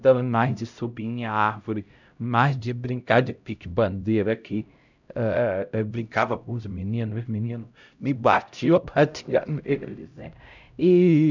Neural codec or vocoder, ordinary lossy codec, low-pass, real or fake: codec, 16 kHz, 0.5 kbps, X-Codec, HuBERT features, trained on LibriSpeech; none; 7.2 kHz; fake